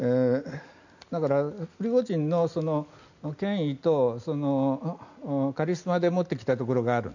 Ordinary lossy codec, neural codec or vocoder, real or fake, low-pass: none; none; real; 7.2 kHz